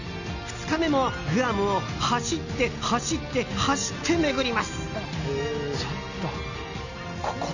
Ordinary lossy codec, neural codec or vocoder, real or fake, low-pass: none; none; real; 7.2 kHz